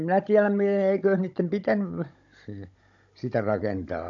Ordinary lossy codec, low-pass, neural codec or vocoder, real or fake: AAC, 48 kbps; 7.2 kHz; codec, 16 kHz, 16 kbps, FunCodec, trained on Chinese and English, 50 frames a second; fake